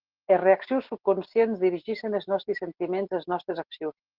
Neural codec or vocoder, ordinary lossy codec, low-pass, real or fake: none; Opus, 16 kbps; 5.4 kHz; real